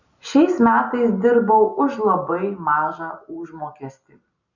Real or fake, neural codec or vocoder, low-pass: real; none; 7.2 kHz